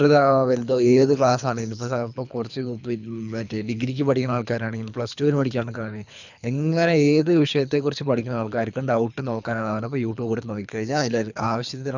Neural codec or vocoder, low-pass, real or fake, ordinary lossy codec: codec, 24 kHz, 3 kbps, HILCodec; 7.2 kHz; fake; none